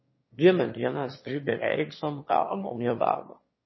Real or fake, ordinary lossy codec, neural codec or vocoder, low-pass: fake; MP3, 24 kbps; autoencoder, 22.05 kHz, a latent of 192 numbers a frame, VITS, trained on one speaker; 7.2 kHz